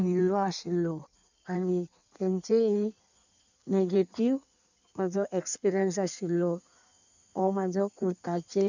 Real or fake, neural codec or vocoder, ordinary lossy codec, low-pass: fake; codec, 16 kHz in and 24 kHz out, 1.1 kbps, FireRedTTS-2 codec; none; 7.2 kHz